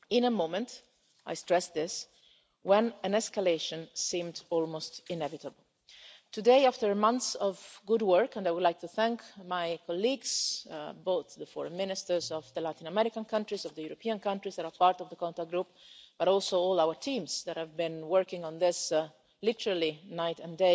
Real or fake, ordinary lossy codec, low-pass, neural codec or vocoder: real; none; none; none